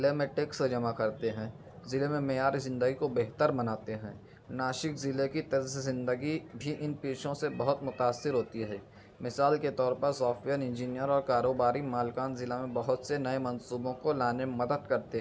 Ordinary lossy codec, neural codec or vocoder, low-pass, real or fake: none; none; none; real